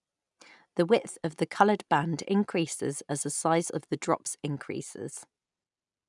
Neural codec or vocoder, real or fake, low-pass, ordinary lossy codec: none; real; 10.8 kHz; none